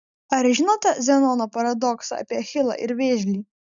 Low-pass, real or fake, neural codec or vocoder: 7.2 kHz; real; none